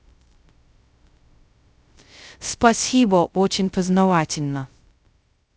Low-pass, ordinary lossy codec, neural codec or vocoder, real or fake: none; none; codec, 16 kHz, 0.2 kbps, FocalCodec; fake